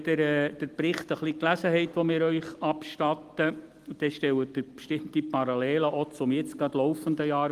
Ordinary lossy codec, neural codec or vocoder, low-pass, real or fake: Opus, 24 kbps; none; 14.4 kHz; real